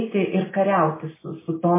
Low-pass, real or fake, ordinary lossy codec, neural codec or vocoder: 3.6 kHz; real; MP3, 16 kbps; none